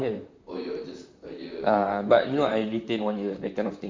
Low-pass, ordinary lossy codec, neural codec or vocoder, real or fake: 7.2 kHz; MP3, 64 kbps; vocoder, 44.1 kHz, 128 mel bands, Pupu-Vocoder; fake